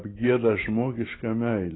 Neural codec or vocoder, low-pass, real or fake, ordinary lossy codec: none; 7.2 kHz; real; AAC, 16 kbps